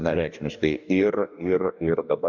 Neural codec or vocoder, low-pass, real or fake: codec, 44.1 kHz, 2.6 kbps, DAC; 7.2 kHz; fake